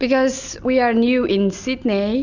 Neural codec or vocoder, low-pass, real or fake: none; 7.2 kHz; real